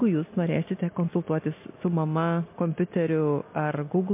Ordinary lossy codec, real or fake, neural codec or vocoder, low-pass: MP3, 24 kbps; real; none; 3.6 kHz